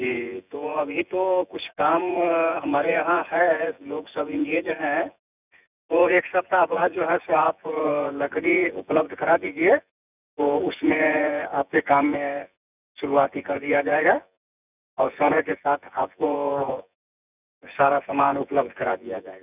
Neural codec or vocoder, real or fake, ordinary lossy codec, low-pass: vocoder, 24 kHz, 100 mel bands, Vocos; fake; none; 3.6 kHz